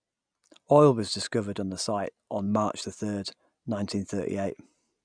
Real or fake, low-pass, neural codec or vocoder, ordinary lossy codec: real; 9.9 kHz; none; none